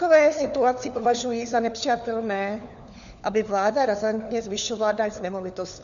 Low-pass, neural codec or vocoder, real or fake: 7.2 kHz; codec, 16 kHz, 4 kbps, FunCodec, trained on LibriTTS, 50 frames a second; fake